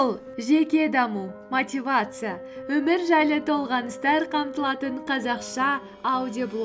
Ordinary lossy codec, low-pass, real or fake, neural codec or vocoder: none; none; real; none